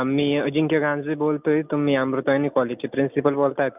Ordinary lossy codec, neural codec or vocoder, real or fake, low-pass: none; none; real; 3.6 kHz